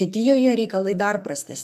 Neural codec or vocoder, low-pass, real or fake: codec, 44.1 kHz, 2.6 kbps, SNAC; 14.4 kHz; fake